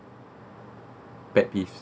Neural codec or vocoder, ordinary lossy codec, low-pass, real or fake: none; none; none; real